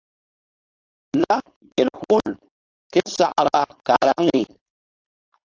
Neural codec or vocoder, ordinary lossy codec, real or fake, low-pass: codec, 16 kHz, 4.8 kbps, FACodec; AAC, 48 kbps; fake; 7.2 kHz